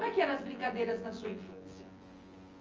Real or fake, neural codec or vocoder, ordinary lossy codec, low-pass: fake; vocoder, 24 kHz, 100 mel bands, Vocos; Opus, 24 kbps; 7.2 kHz